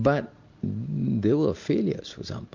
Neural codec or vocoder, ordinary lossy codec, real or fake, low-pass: none; MP3, 48 kbps; real; 7.2 kHz